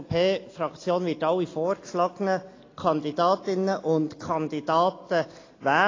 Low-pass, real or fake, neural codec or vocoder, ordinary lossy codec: 7.2 kHz; real; none; AAC, 32 kbps